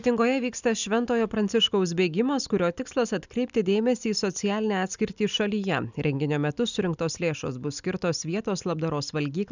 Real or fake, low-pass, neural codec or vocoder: real; 7.2 kHz; none